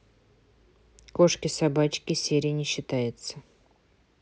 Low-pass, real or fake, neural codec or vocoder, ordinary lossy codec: none; real; none; none